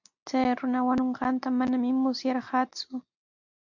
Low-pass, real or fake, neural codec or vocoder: 7.2 kHz; real; none